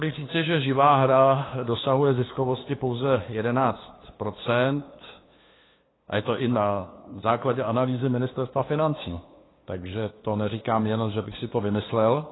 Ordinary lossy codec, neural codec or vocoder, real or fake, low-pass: AAC, 16 kbps; codec, 16 kHz, 2 kbps, FunCodec, trained on LibriTTS, 25 frames a second; fake; 7.2 kHz